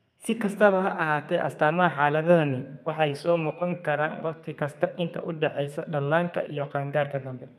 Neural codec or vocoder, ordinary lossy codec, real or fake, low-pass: codec, 32 kHz, 1.9 kbps, SNAC; none; fake; 14.4 kHz